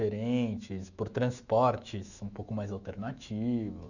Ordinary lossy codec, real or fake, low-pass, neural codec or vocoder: none; fake; 7.2 kHz; autoencoder, 48 kHz, 128 numbers a frame, DAC-VAE, trained on Japanese speech